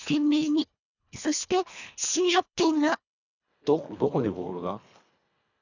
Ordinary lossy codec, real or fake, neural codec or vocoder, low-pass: none; fake; codec, 24 kHz, 1.5 kbps, HILCodec; 7.2 kHz